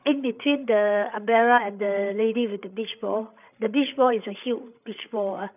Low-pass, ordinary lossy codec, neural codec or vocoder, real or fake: 3.6 kHz; none; codec, 16 kHz, 8 kbps, FreqCodec, larger model; fake